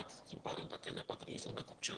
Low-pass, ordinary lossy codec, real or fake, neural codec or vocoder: 9.9 kHz; Opus, 16 kbps; fake; autoencoder, 22.05 kHz, a latent of 192 numbers a frame, VITS, trained on one speaker